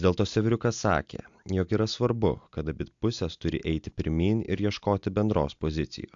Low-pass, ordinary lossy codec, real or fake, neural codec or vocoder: 7.2 kHz; AAC, 64 kbps; real; none